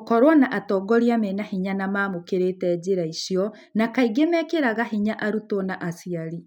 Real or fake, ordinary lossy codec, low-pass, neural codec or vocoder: real; none; 19.8 kHz; none